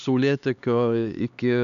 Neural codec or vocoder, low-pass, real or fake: codec, 16 kHz, 2 kbps, X-Codec, HuBERT features, trained on LibriSpeech; 7.2 kHz; fake